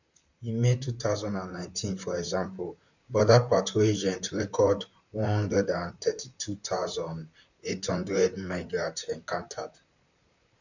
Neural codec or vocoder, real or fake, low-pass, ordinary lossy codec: vocoder, 44.1 kHz, 128 mel bands, Pupu-Vocoder; fake; 7.2 kHz; none